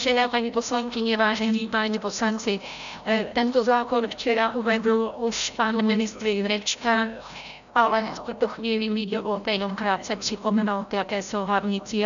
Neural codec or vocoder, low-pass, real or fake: codec, 16 kHz, 0.5 kbps, FreqCodec, larger model; 7.2 kHz; fake